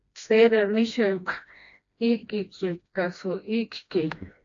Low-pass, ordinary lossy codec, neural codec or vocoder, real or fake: 7.2 kHz; AAC, 48 kbps; codec, 16 kHz, 1 kbps, FreqCodec, smaller model; fake